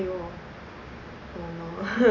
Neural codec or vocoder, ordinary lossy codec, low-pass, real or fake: none; none; 7.2 kHz; real